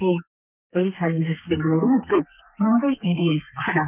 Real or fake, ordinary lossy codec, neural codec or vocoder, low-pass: fake; none; codec, 32 kHz, 1.9 kbps, SNAC; 3.6 kHz